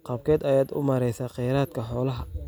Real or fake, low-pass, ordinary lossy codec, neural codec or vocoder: real; none; none; none